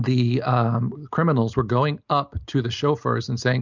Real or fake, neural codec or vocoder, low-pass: real; none; 7.2 kHz